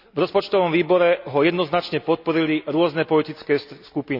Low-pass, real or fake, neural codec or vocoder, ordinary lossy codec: 5.4 kHz; real; none; none